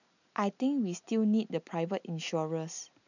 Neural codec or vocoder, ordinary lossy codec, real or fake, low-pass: none; none; real; 7.2 kHz